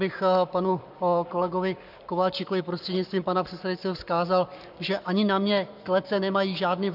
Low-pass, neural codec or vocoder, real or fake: 5.4 kHz; codec, 44.1 kHz, 7.8 kbps, Pupu-Codec; fake